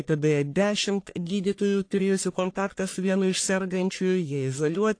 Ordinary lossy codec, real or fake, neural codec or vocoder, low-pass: AAC, 48 kbps; fake; codec, 44.1 kHz, 1.7 kbps, Pupu-Codec; 9.9 kHz